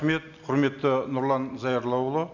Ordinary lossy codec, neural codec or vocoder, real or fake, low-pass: none; none; real; 7.2 kHz